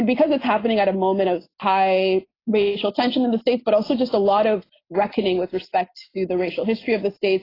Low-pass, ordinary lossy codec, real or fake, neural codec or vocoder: 5.4 kHz; AAC, 24 kbps; real; none